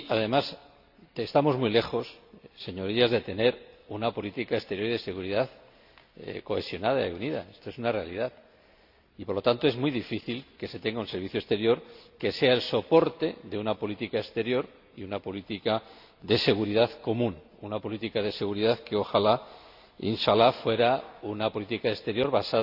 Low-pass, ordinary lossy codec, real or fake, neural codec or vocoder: 5.4 kHz; AAC, 48 kbps; real; none